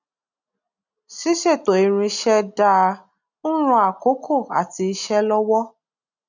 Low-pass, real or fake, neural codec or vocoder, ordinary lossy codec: 7.2 kHz; real; none; none